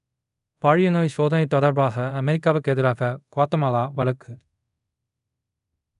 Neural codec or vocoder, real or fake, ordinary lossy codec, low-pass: codec, 24 kHz, 0.5 kbps, DualCodec; fake; none; 10.8 kHz